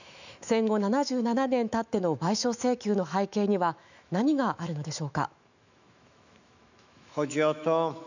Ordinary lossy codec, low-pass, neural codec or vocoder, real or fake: none; 7.2 kHz; autoencoder, 48 kHz, 128 numbers a frame, DAC-VAE, trained on Japanese speech; fake